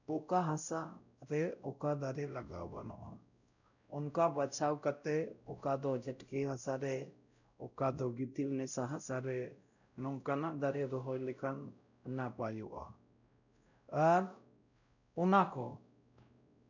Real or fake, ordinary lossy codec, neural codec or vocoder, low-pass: fake; none; codec, 16 kHz, 0.5 kbps, X-Codec, WavLM features, trained on Multilingual LibriSpeech; 7.2 kHz